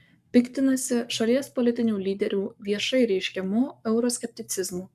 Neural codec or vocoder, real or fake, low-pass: codec, 44.1 kHz, 7.8 kbps, Pupu-Codec; fake; 14.4 kHz